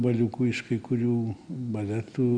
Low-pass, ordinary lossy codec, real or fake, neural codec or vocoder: 9.9 kHz; Opus, 64 kbps; real; none